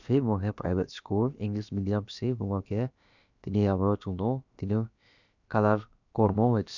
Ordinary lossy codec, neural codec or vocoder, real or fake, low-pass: none; codec, 16 kHz, about 1 kbps, DyCAST, with the encoder's durations; fake; 7.2 kHz